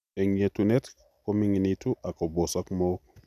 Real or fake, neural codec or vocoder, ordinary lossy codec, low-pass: real; none; none; 14.4 kHz